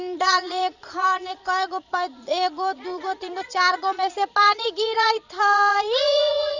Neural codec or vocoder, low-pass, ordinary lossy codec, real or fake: vocoder, 22.05 kHz, 80 mel bands, Vocos; 7.2 kHz; none; fake